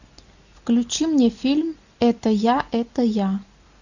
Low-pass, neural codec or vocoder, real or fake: 7.2 kHz; none; real